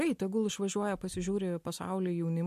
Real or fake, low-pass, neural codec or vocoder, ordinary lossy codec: real; 14.4 kHz; none; MP3, 64 kbps